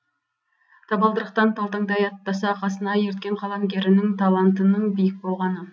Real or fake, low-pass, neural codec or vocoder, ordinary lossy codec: real; none; none; none